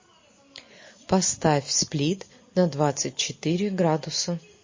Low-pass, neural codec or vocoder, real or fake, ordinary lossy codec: 7.2 kHz; none; real; MP3, 32 kbps